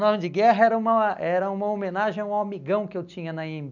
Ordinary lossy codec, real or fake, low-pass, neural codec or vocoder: none; real; 7.2 kHz; none